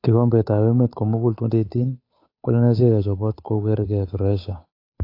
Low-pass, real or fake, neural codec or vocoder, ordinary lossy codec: 5.4 kHz; fake; codec, 16 kHz, 8 kbps, FunCodec, trained on Chinese and English, 25 frames a second; AAC, 32 kbps